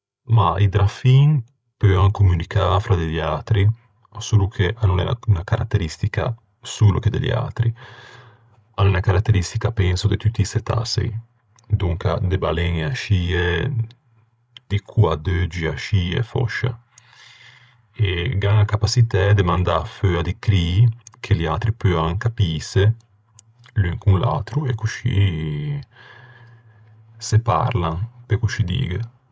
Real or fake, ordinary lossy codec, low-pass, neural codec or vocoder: fake; none; none; codec, 16 kHz, 16 kbps, FreqCodec, larger model